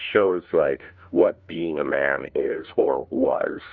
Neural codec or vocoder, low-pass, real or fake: codec, 16 kHz, 1 kbps, FunCodec, trained on LibriTTS, 50 frames a second; 7.2 kHz; fake